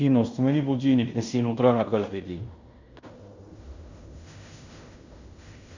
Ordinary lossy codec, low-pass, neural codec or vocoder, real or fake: Opus, 64 kbps; 7.2 kHz; codec, 16 kHz in and 24 kHz out, 0.9 kbps, LongCat-Audio-Codec, fine tuned four codebook decoder; fake